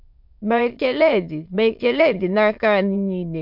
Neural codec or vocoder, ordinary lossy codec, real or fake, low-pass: autoencoder, 22.05 kHz, a latent of 192 numbers a frame, VITS, trained on many speakers; MP3, 48 kbps; fake; 5.4 kHz